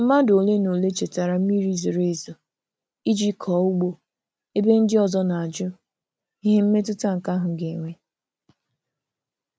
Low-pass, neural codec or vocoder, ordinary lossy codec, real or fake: none; none; none; real